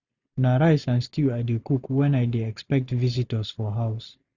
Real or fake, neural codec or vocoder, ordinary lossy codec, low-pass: real; none; none; 7.2 kHz